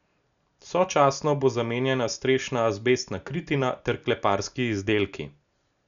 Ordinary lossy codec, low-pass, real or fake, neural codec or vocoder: none; 7.2 kHz; real; none